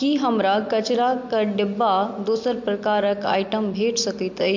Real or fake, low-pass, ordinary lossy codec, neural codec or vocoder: real; 7.2 kHz; MP3, 48 kbps; none